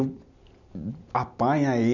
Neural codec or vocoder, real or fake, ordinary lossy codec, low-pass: none; real; none; 7.2 kHz